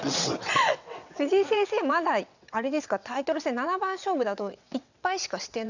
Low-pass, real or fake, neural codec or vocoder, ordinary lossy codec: 7.2 kHz; fake; vocoder, 22.05 kHz, 80 mel bands, WaveNeXt; none